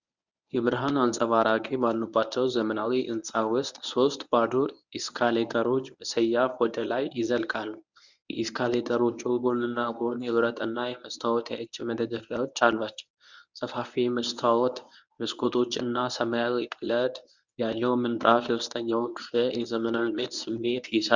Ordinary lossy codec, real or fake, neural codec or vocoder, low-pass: Opus, 64 kbps; fake; codec, 24 kHz, 0.9 kbps, WavTokenizer, medium speech release version 1; 7.2 kHz